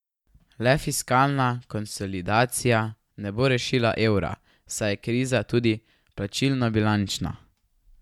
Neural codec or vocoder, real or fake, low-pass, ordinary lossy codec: none; real; 19.8 kHz; MP3, 96 kbps